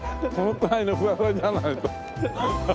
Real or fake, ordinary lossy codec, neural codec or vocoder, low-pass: real; none; none; none